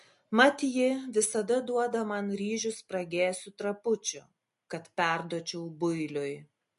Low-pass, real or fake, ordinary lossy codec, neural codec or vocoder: 14.4 kHz; real; MP3, 48 kbps; none